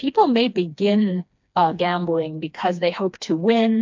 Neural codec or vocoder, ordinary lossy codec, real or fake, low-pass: codec, 16 kHz, 2 kbps, FreqCodec, smaller model; MP3, 64 kbps; fake; 7.2 kHz